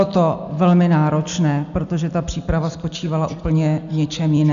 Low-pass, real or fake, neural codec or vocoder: 7.2 kHz; real; none